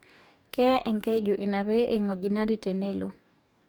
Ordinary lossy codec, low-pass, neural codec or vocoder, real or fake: none; 19.8 kHz; codec, 44.1 kHz, 2.6 kbps, DAC; fake